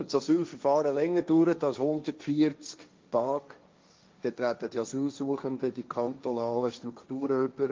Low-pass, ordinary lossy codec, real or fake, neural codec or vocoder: 7.2 kHz; Opus, 24 kbps; fake; codec, 16 kHz, 1.1 kbps, Voila-Tokenizer